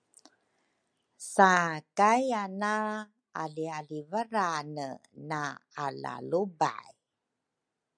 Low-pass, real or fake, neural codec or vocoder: 9.9 kHz; real; none